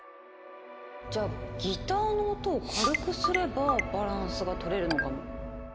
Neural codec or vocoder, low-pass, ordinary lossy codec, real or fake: none; none; none; real